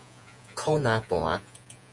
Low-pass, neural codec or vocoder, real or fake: 10.8 kHz; vocoder, 48 kHz, 128 mel bands, Vocos; fake